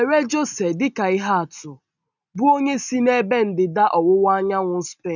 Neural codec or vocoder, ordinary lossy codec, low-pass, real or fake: none; none; 7.2 kHz; real